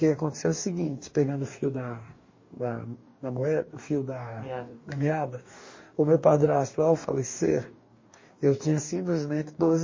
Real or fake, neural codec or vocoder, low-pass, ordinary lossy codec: fake; codec, 44.1 kHz, 2.6 kbps, DAC; 7.2 kHz; MP3, 32 kbps